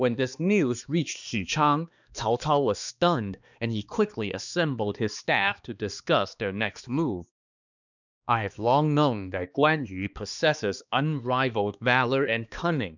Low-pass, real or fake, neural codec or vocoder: 7.2 kHz; fake; codec, 16 kHz, 2 kbps, X-Codec, HuBERT features, trained on balanced general audio